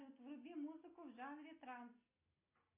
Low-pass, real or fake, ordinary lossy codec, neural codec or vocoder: 3.6 kHz; real; AAC, 32 kbps; none